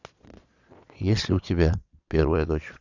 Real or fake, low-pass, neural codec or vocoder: fake; 7.2 kHz; vocoder, 22.05 kHz, 80 mel bands, Vocos